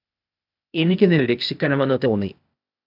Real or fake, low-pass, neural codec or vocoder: fake; 5.4 kHz; codec, 16 kHz, 0.8 kbps, ZipCodec